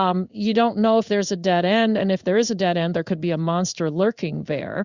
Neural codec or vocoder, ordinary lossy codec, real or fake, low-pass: codec, 16 kHz in and 24 kHz out, 1 kbps, XY-Tokenizer; Opus, 64 kbps; fake; 7.2 kHz